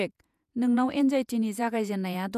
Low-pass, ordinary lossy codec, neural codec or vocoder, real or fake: 14.4 kHz; none; vocoder, 48 kHz, 128 mel bands, Vocos; fake